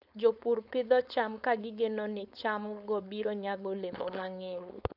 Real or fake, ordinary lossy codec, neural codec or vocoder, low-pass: fake; none; codec, 16 kHz, 4.8 kbps, FACodec; 5.4 kHz